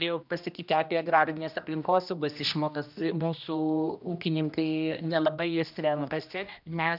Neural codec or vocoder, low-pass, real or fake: codec, 16 kHz, 1 kbps, X-Codec, HuBERT features, trained on general audio; 5.4 kHz; fake